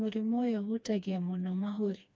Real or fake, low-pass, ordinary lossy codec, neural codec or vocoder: fake; none; none; codec, 16 kHz, 2 kbps, FreqCodec, smaller model